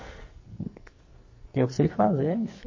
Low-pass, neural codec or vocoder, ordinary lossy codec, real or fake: 7.2 kHz; codec, 44.1 kHz, 2.6 kbps, SNAC; MP3, 32 kbps; fake